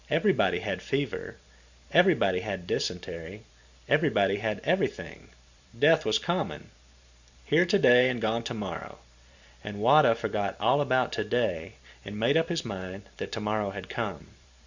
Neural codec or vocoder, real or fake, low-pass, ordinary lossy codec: vocoder, 44.1 kHz, 128 mel bands every 512 samples, BigVGAN v2; fake; 7.2 kHz; Opus, 64 kbps